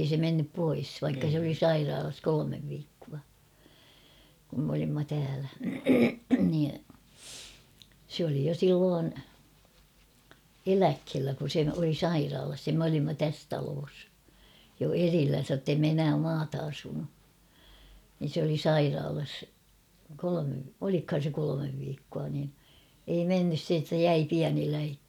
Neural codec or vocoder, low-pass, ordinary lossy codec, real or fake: none; 19.8 kHz; none; real